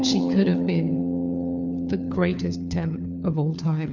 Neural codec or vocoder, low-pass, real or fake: codec, 16 kHz, 4 kbps, FunCodec, trained on LibriTTS, 50 frames a second; 7.2 kHz; fake